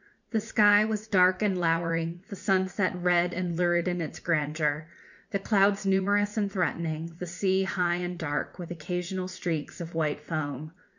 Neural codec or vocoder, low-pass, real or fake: vocoder, 44.1 kHz, 80 mel bands, Vocos; 7.2 kHz; fake